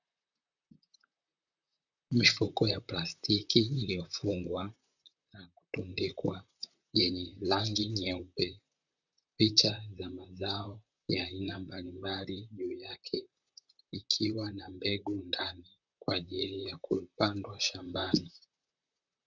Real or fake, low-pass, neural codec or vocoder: fake; 7.2 kHz; vocoder, 44.1 kHz, 128 mel bands, Pupu-Vocoder